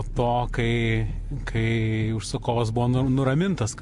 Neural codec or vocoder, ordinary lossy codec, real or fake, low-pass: none; MP3, 64 kbps; real; 10.8 kHz